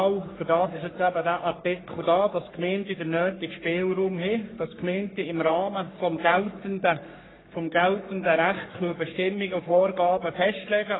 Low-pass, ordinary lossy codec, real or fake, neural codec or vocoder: 7.2 kHz; AAC, 16 kbps; fake; codec, 44.1 kHz, 3.4 kbps, Pupu-Codec